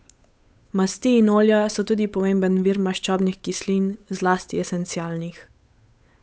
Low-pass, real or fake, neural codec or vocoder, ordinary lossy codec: none; fake; codec, 16 kHz, 8 kbps, FunCodec, trained on Chinese and English, 25 frames a second; none